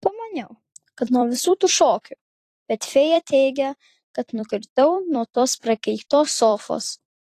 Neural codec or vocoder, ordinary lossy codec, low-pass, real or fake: vocoder, 44.1 kHz, 128 mel bands every 256 samples, BigVGAN v2; AAC, 48 kbps; 14.4 kHz; fake